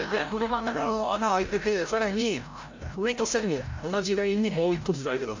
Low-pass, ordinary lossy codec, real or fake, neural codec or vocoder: 7.2 kHz; MP3, 48 kbps; fake; codec, 16 kHz, 0.5 kbps, FreqCodec, larger model